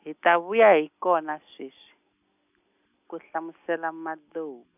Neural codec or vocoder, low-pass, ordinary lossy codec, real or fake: none; 3.6 kHz; none; real